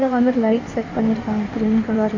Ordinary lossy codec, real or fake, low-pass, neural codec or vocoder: MP3, 64 kbps; fake; 7.2 kHz; codec, 16 kHz in and 24 kHz out, 1.1 kbps, FireRedTTS-2 codec